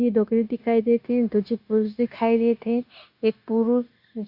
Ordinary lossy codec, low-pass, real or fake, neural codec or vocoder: Opus, 64 kbps; 5.4 kHz; fake; codec, 16 kHz, 0.9 kbps, LongCat-Audio-Codec